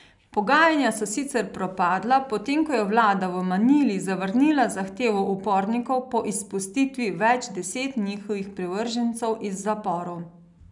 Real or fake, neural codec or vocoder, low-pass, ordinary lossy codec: real; none; 10.8 kHz; none